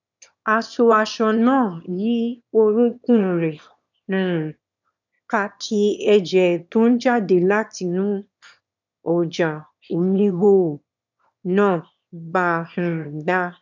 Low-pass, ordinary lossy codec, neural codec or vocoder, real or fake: 7.2 kHz; none; autoencoder, 22.05 kHz, a latent of 192 numbers a frame, VITS, trained on one speaker; fake